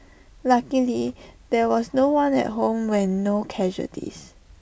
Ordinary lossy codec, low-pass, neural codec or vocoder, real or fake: none; none; none; real